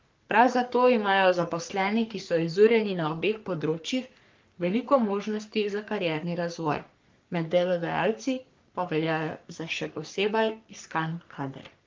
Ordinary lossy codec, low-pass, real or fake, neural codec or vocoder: Opus, 32 kbps; 7.2 kHz; fake; codec, 44.1 kHz, 3.4 kbps, Pupu-Codec